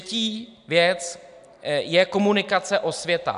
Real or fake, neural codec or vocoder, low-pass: real; none; 10.8 kHz